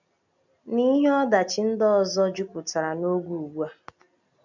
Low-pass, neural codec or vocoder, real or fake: 7.2 kHz; none; real